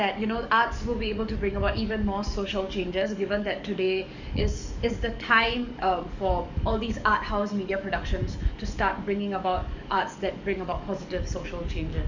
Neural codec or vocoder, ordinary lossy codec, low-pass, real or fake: codec, 44.1 kHz, 7.8 kbps, DAC; Opus, 64 kbps; 7.2 kHz; fake